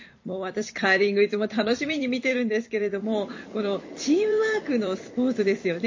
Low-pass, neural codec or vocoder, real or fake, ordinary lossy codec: 7.2 kHz; vocoder, 44.1 kHz, 128 mel bands every 256 samples, BigVGAN v2; fake; MP3, 32 kbps